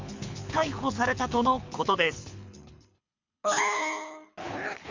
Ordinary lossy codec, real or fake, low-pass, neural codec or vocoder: MP3, 64 kbps; fake; 7.2 kHz; codec, 24 kHz, 6 kbps, HILCodec